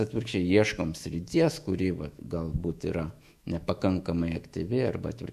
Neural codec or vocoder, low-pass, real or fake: autoencoder, 48 kHz, 128 numbers a frame, DAC-VAE, trained on Japanese speech; 14.4 kHz; fake